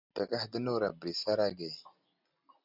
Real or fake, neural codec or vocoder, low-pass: real; none; 5.4 kHz